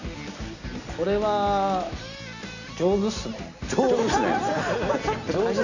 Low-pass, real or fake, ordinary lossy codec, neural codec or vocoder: 7.2 kHz; real; none; none